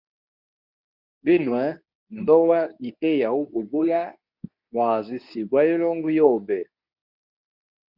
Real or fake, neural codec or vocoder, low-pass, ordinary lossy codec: fake; codec, 24 kHz, 0.9 kbps, WavTokenizer, medium speech release version 2; 5.4 kHz; Opus, 64 kbps